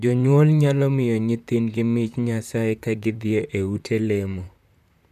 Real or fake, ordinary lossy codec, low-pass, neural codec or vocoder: fake; none; 14.4 kHz; vocoder, 44.1 kHz, 128 mel bands, Pupu-Vocoder